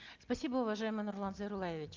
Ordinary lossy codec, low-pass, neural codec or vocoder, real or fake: Opus, 24 kbps; 7.2 kHz; none; real